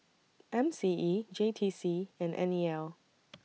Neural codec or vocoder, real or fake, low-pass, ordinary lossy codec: none; real; none; none